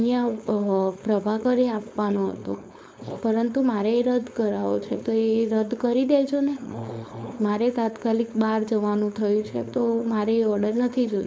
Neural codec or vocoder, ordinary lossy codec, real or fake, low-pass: codec, 16 kHz, 4.8 kbps, FACodec; none; fake; none